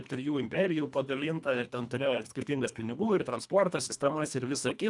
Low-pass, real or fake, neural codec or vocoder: 10.8 kHz; fake; codec, 24 kHz, 1.5 kbps, HILCodec